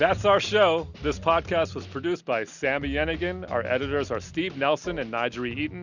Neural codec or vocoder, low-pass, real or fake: none; 7.2 kHz; real